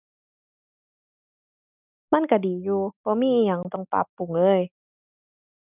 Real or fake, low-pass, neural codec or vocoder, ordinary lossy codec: real; 3.6 kHz; none; none